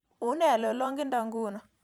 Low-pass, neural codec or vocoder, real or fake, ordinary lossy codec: 19.8 kHz; vocoder, 44.1 kHz, 128 mel bands every 512 samples, BigVGAN v2; fake; none